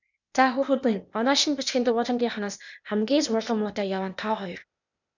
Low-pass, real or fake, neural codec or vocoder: 7.2 kHz; fake; codec, 16 kHz, 0.8 kbps, ZipCodec